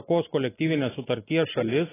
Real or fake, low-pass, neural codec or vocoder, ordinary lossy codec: real; 3.6 kHz; none; AAC, 16 kbps